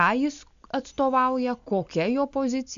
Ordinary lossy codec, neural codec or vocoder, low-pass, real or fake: AAC, 96 kbps; none; 7.2 kHz; real